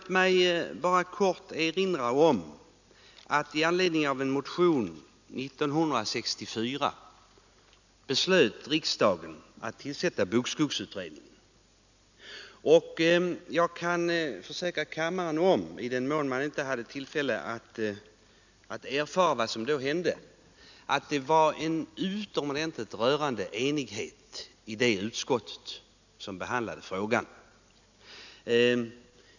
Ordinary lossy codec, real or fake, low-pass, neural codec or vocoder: none; real; 7.2 kHz; none